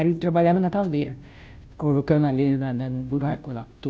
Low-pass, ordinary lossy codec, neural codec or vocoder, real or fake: none; none; codec, 16 kHz, 0.5 kbps, FunCodec, trained on Chinese and English, 25 frames a second; fake